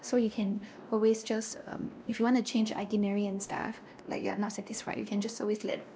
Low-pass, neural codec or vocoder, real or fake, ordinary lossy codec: none; codec, 16 kHz, 1 kbps, X-Codec, WavLM features, trained on Multilingual LibriSpeech; fake; none